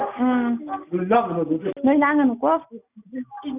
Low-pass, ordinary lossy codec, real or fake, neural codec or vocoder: 3.6 kHz; none; real; none